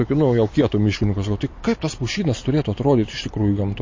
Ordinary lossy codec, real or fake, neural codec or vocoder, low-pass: MP3, 32 kbps; real; none; 7.2 kHz